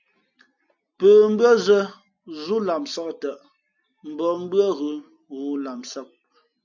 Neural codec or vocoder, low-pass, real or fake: none; 7.2 kHz; real